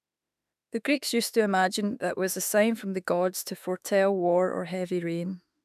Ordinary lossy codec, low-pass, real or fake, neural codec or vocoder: none; 14.4 kHz; fake; autoencoder, 48 kHz, 32 numbers a frame, DAC-VAE, trained on Japanese speech